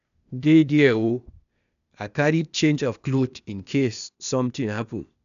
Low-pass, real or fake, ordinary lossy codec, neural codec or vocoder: 7.2 kHz; fake; none; codec, 16 kHz, 0.8 kbps, ZipCodec